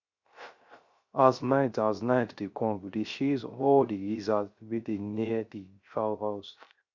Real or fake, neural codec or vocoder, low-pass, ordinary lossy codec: fake; codec, 16 kHz, 0.3 kbps, FocalCodec; 7.2 kHz; Opus, 64 kbps